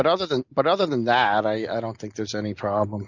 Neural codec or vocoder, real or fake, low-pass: none; real; 7.2 kHz